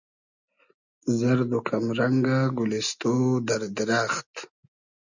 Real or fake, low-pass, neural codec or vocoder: real; 7.2 kHz; none